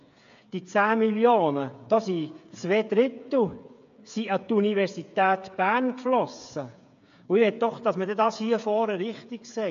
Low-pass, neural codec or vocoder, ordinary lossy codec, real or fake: 7.2 kHz; codec, 16 kHz, 8 kbps, FreqCodec, smaller model; AAC, 64 kbps; fake